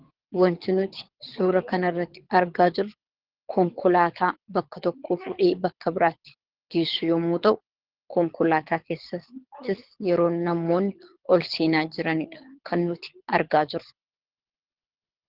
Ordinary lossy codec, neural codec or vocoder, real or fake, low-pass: Opus, 16 kbps; codec, 24 kHz, 6 kbps, HILCodec; fake; 5.4 kHz